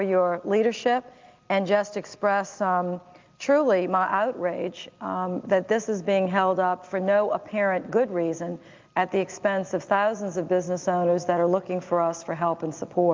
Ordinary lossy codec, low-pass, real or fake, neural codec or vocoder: Opus, 24 kbps; 7.2 kHz; real; none